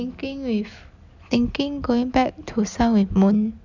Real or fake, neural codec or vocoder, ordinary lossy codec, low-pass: real; none; none; 7.2 kHz